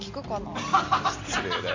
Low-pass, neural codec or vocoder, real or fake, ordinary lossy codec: 7.2 kHz; none; real; MP3, 48 kbps